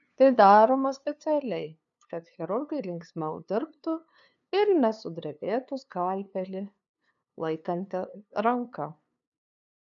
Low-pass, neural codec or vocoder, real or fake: 7.2 kHz; codec, 16 kHz, 2 kbps, FunCodec, trained on LibriTTS, 25 frames a second; fake